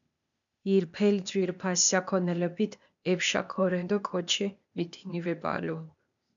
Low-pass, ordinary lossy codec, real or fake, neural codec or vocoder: 7.2 kHz; MP3, 96 kbps; fake; codec, 16 kHz, 0.8 kbps, ZipCodec